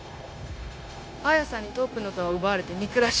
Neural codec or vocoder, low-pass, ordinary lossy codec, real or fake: codec, 16 kHz, 0.9 kbps, LongCat-Audio-Codec; none; none; fake